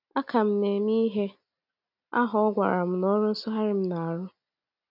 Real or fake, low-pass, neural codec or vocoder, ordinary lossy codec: real; 5.4 kHz; none; none